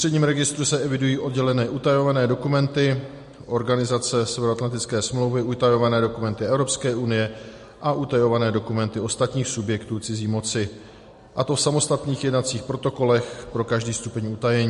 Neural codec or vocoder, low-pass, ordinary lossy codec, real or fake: none; 10.8 kHz; MP3, 48 kbps; real